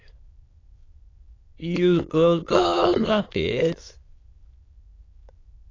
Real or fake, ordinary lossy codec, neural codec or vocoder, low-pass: fake; AAC, 32 kbps; autoencoder, 22.05 kHz, a latent of 192 numbers a frame, VITS, trained on many speakers; 7.2 kHz